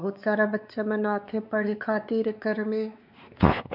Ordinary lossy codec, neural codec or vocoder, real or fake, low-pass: none; codec, 16 kHz, 4 kbps, X-Codec, WavLM features, trained on Multilingual LibriSpeech; fake; 5.4 kHz